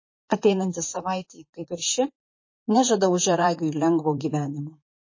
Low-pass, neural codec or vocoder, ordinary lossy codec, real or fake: 7.2 kHz; vocoder, 44.1 kHz, 128 mel bands, Pupu-Vocoder; MP3, 32 kbps; fake